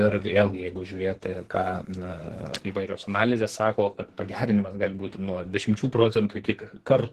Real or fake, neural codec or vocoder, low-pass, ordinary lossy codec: fake; codec, 44.1 kHz, 2.6 kbps, SNAC; 14.4 kHz; Opus, 16 kbps